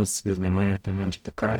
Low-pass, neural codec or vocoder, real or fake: 19.8 kHz; codec, 44.1 kHz, 0.9 kbps, DAC; fake